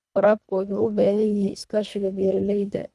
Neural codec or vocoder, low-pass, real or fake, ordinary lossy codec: codec, 24 kHz, 1.5 kbps, HILCodec; none; fake; none